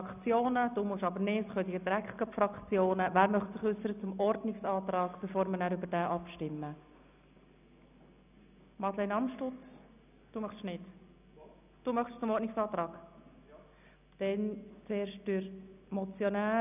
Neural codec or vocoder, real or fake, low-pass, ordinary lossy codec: none; real; 3.6 kHz; none